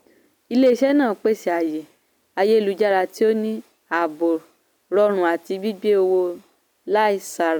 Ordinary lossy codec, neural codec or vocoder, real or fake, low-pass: none; none; real; none